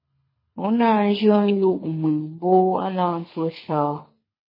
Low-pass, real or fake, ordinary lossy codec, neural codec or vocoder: 5.4 kHz; fake; MP3, 24 kbps; codec, 24 kHz, 6 kbps, HILCodec